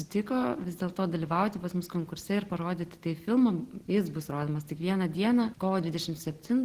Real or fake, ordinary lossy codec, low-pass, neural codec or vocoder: real; Opus, 16 kbps; 14.4 kHz; none